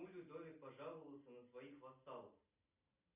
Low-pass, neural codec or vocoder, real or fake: 3.6 kHz; none; real